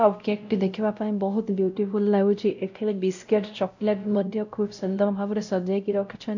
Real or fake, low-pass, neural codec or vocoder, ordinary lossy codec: fake; 7.2 kHz; codec, 16 kHz, 0.5 kbps, X-Codec, WavLM features, trained on Multilingual LibriSpeech; none